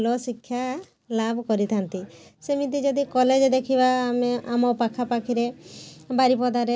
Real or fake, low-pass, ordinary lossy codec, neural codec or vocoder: real; none; none; none